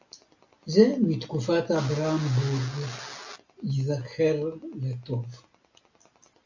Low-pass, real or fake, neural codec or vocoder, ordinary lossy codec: 7.2 kHz; real; none; AAC, 48 kbps